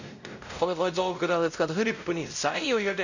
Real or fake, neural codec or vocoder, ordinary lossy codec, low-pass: fake; codec, 16 kHz, 0.5 kbps, X-Codec, WavLM features, trained on Multilingual LibriSpeech; none; 7.2 kHz